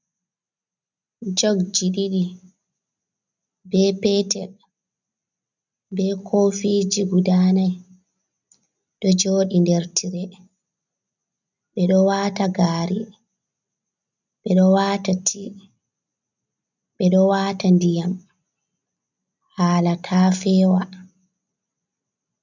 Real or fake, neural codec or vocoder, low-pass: real; none; 7.2 kHz